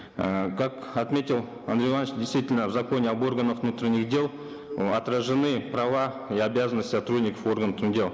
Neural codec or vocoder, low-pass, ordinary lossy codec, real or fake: none; none; none; real